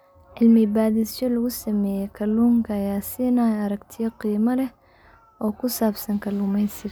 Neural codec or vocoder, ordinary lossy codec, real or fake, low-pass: none; none; real; none